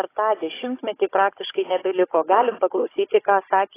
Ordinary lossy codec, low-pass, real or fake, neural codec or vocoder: AAC, 16 kbps; 3.6 kHz; fake; codec, 16 kHz, 16 kbps, FunCodec, trained on Chinese and English, 50 frames a second